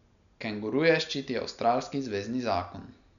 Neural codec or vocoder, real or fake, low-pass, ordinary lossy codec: none; real; 7.2 kHz; none